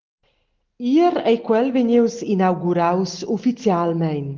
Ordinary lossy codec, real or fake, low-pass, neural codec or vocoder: Opus, 16 kbps; real; 7.2 kHz; none